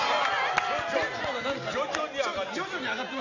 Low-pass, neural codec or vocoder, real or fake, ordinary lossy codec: 7.2 kHz; none; real; none